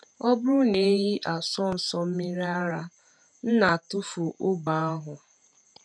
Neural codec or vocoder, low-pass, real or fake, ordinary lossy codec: vocoder, 48 kHz, 128 mel bands, Vocos; 9.9 kHz; fake; none